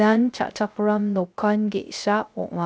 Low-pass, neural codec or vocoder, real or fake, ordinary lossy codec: none; codec, 16 kHz, 0.3 kbps, FocalCodec; fake; none